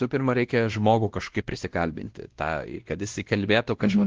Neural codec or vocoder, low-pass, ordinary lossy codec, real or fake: codec, 16 kHz, 1 kbps, X-Codec, WavLM features, trained on Multilingual LibriSpeech; 7.2 kHz; Opus, 16 kbps; fake